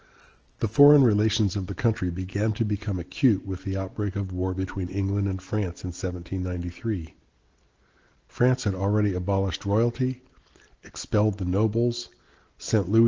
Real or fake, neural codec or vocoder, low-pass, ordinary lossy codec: real; none; 7.2 kHz; Opus, 16 kbps